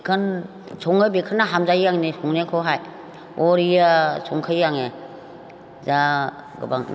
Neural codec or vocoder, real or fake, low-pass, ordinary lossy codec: none; real; none; none